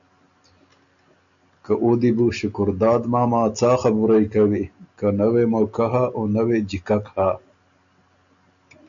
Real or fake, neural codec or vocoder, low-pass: real; none; 7.2 kHz